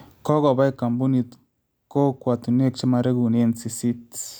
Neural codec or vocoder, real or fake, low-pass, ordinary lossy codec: none; real; none; none